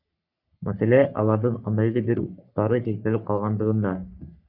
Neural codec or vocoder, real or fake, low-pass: codec, 44.1 kHz, 3.4 kbps, Pupu-Codec; fake; 5.4 kHz